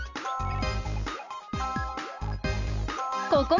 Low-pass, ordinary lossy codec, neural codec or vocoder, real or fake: 7.2 kHz; none; none; real